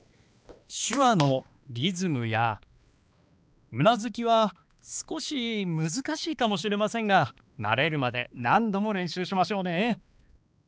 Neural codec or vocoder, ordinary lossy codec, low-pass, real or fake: codec, 16 kHz, 2 kbps, X-Codec, HuBERT features, trained on balanced general audio; none; none; fake